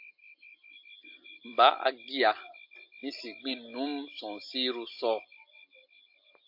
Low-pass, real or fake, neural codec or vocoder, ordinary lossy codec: 5.4 kHz; real; none; AAC, 48 kbps